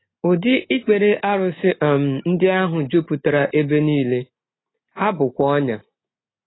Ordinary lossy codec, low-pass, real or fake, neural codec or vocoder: AAC, 16 kbps; 7.2 kHz; real; none